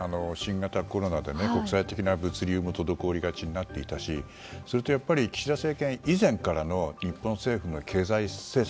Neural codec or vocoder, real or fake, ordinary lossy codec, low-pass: none; real; none; none